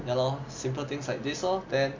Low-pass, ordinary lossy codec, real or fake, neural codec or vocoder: 7.2 kHz; MP3, 48 kbps; real; none